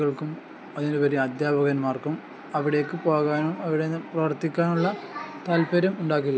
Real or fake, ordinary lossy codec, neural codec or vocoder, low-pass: real; none; none; none